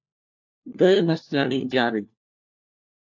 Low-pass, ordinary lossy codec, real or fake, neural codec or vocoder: 7.2 kHz; AAC, 48 kbps; fake; codec, 16 kHz, 1 kbps, FunCodec, trained on LibriTTS, 50 frames a second